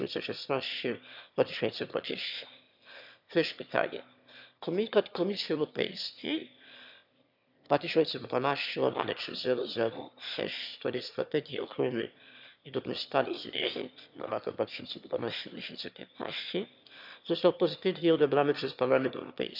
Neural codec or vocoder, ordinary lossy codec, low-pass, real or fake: autoencoder, 22.05 kHz, a latent of 192 numbers a frame, VITS, trained on one speaker; none; 5.4 kHz; fake